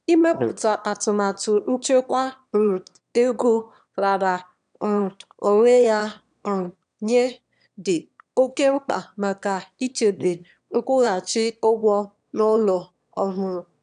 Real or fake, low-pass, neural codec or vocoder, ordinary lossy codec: fake; 9.9 kHz; autoencoder, 22.05 kHz, a latent of 192 numbers a frame, VITS, trained on one speaker; none